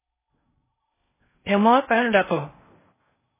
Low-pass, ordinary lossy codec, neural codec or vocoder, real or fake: 3.6 kHz; MP3, 16 kbps; codec, 16 kHz in and 24 kHz out, 0.6 kbps, FocalCodec, streaming, 4096 codes; fake